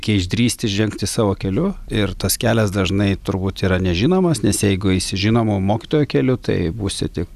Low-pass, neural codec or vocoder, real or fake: 14.4 kHz; none; real